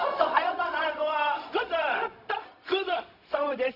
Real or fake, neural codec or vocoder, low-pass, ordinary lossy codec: fake; codec, 16 kHz, 0.4 kbps, LongCat-Audio-Codec; 5.4 kHz; none